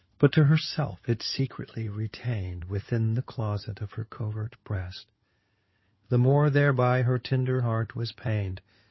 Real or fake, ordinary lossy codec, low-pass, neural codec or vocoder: fake; MP3, 24 kbps; 7.2 kHz; codec, 16 kHz in and 24 kHz out, 2.2 kbps, FireRedTTS-2 codec